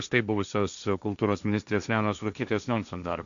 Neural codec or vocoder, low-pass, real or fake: codec, 16 kHz, 1.1 kbps, Voila-Tokenizer; 7.2 kHz; fake